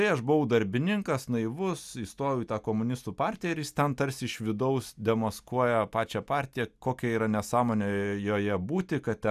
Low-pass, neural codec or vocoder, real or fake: 14.4 kHz; none; real